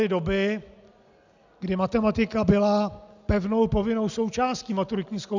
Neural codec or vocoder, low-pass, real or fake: none; 7.2 kHz; real